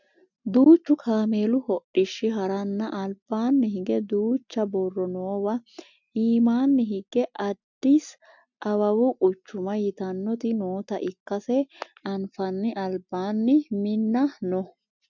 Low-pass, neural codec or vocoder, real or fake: 7.2 kHz; none; real